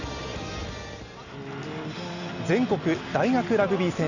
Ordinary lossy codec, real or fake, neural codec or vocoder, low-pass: none; real; none; 7.2 kHz